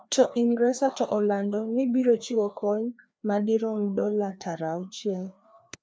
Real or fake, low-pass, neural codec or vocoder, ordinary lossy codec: fake; none; codec, 16 kHz, 2 kbps, FreqCodec, larger model; none